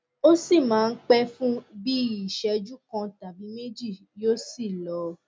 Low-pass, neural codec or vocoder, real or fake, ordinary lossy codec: none; none; real; none